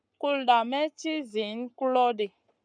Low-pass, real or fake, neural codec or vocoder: 9.9 kHz; fake; codec, 44.1 kHz, 7.8 kbps, Pupu-Codec